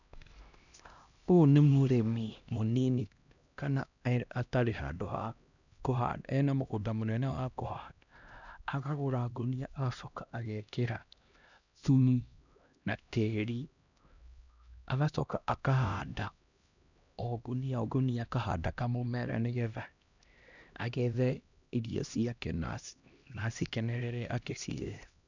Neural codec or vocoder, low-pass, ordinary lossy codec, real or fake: codec, 16 kHz, 1 kbps, X-Codec, HuBERT features, trained on LibriSpeech; 7.2 kHz; none; fake